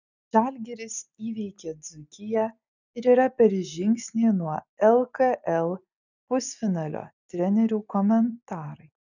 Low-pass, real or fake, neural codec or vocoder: 7.2 kHz; real; none